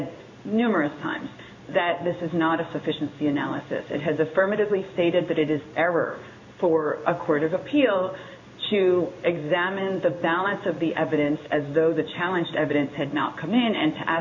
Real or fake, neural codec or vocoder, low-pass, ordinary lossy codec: fake; codec, 16 kHz in and 24 kHz out, 1 kbps, XY-Tokenizer; 7.2 kHz; MP3, 48 kbps